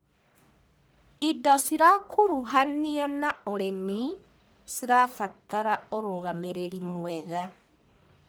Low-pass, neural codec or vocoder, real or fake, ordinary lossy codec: none; codec, 44.1 kHz, 1.7 kbps, Pupu-Codec; fake; none